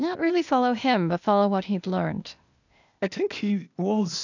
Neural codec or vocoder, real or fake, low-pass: codec, 16 kHz, 0.8 kbps, ZipCodec; fake; 7.2 kHz